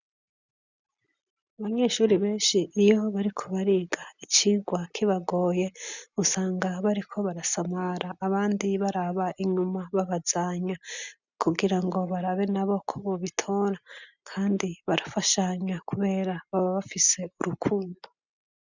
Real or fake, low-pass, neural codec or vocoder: real; 7.2 kHz; none